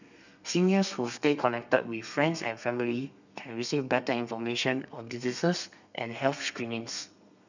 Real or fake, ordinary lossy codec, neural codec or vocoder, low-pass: fake; none; codec, 32 kHz, 1.9 kbps, SNAC; 7.2 kHz